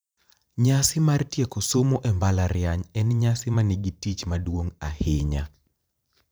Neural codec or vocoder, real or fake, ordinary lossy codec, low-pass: vocoder, 44.1 kHz, 128 mel bands every 512 samples, BigVGAN v2; fake; none; none